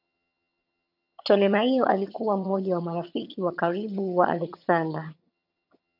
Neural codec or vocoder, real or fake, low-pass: vocoder, 22.05 kHz, 80 mel bands, HiFi-GAN; fake; 5.4 kHz